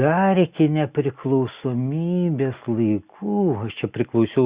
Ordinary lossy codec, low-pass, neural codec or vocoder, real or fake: Opus, 64 kbps; 3.6 kHz; none; real